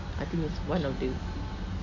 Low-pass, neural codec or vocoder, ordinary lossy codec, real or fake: 7.2 kHz; none; none; real